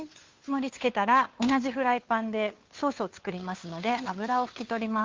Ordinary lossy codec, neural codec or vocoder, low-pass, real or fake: Opus, 32 kbps; codec, 16 kHz, 2 kbps, FunCodec, trained on Chinese and English, 25 frames a second; 7.2 kHz; fake